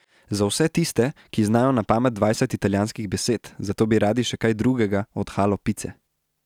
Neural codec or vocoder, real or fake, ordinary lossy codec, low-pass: none; real; none; 19.8 kHz